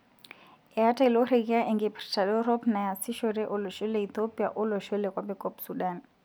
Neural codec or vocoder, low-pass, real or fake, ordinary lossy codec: none; none; real; none